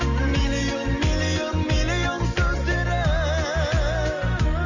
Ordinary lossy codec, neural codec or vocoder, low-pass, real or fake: none; none; 7.2 kHz; real